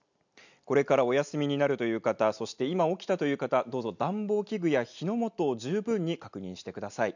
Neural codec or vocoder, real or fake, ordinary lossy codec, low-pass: none; real; none; 7.2 kHz